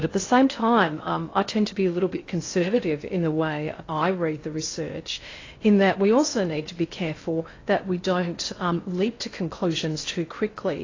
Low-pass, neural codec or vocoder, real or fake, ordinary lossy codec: 7.2 kHz; codec, 16 kHz in and 24 kHz out, 0.6 kbps, FocalCodec, streaming, 4096 codes; fake; AAC, 32 kbps